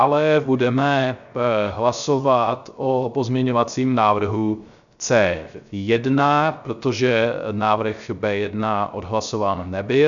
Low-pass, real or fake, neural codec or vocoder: 7.2 kHz; fake; codec, 16 kHz, 0.3 kbps, FocalCodec